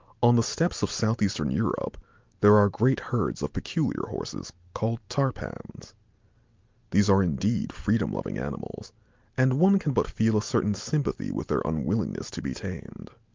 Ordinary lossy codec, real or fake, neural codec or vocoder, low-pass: Opus, 24 kbps; real; none; 7.2 kHz